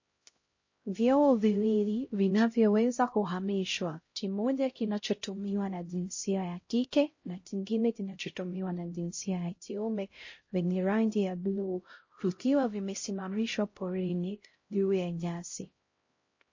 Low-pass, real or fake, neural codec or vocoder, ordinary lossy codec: 7.2 kHz; fake; codec, 16 kHz, 0.5 kbps, X-Codec, HuBERT features, trained on LibriSpeech; MP3, 32 kbps